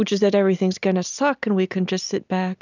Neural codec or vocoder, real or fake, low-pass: none; real; 7.2 kHz